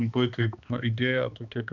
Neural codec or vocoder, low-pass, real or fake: codec, 16 kHz, 2 kbps, X-Codec, HuBERT features, trained on balanced general audio; 7.2 kHz; fake